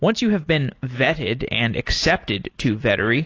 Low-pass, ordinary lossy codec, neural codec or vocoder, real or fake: 7.2 kHz; AAC, 32 kbps; none; real